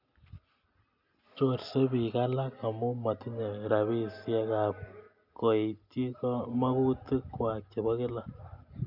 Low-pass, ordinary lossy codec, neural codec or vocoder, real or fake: 5.4 kHz; none; none; real